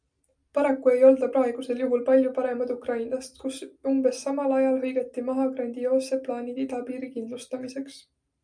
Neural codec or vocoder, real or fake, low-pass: none; real; 9.9 kHz